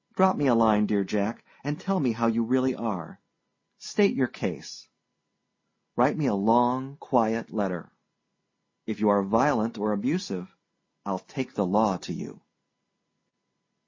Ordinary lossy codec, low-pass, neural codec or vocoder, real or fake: MP3, 32 kbps; 7.2 kHz; none; real